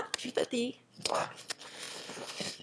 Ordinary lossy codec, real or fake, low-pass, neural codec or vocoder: none; fake; none; autoencoder, 22.05 kHz, a latent of 192 numbers a frame, VITS, trained on one speaker